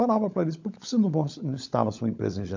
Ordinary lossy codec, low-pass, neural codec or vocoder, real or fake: MP3, 64 kbps; 7.2 kHz; codec, 16 kHz, 16 kbps, FunCodec, trained on LibriTTS, 50 frames a second; fake